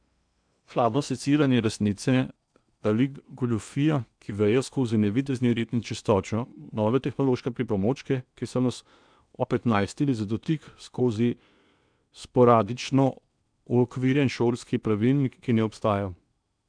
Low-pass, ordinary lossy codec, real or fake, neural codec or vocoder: 9.9 kHz; none; fake; codec, 16 kHz in and 24 kHz out, 0.8 kbps, FocalCodec, streaming, 65536 codes